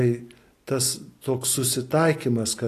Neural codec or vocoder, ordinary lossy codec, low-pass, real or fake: none; AAC, 48 kbps; 14.4 kHz; real